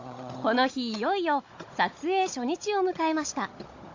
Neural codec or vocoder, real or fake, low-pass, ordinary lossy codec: codec, 16 kHz, 16 kbps, FunCodec, trained on Chinese and English, 50 frames a second; fake; 7.2 kHz; AAC, 48 kbps